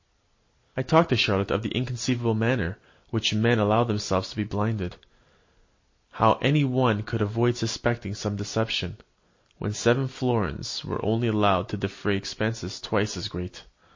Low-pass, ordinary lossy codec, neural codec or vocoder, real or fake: 7.2 kHz; MP3, 32 kbps; none; real